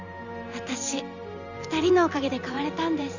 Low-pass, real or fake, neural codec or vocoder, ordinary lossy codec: 7.2 kHz; fake; vocoder, 44.1 kHz, 128 mel bands every 512 samples, BigVGAN v2; none